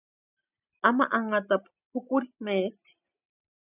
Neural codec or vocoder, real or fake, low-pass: none; real; 3.6 kHz